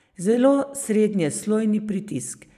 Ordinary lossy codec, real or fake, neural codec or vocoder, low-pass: none; real; none; 14.4 kHz